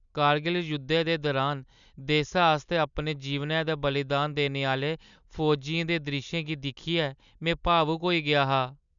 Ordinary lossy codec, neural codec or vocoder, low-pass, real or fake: none; none; 7.2 kHz; real